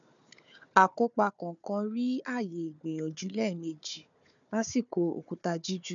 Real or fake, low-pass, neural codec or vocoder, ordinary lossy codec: fake; 7.2 kHz; codec, 16 kHz, 4 kbps, FunCodec, trained on Chinese and English, 50 frames a second; none